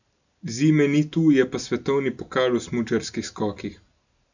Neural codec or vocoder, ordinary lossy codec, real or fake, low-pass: none; none; real; 7.2 kHz